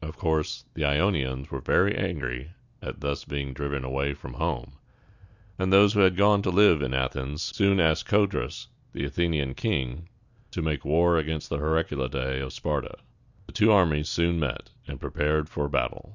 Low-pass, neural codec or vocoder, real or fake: 7.2 kHz; none; real